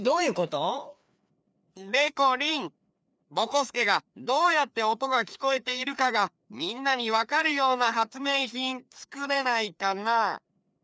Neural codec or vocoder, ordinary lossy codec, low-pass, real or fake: codec, 16 kHz, 2 kbps, FreqCodec, larger model; none; none; fake